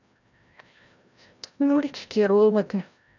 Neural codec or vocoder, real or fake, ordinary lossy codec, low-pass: codec, 16 kHz, 0.5 kbps, FreqCodec, larger model; fake; none; 7.2 kHz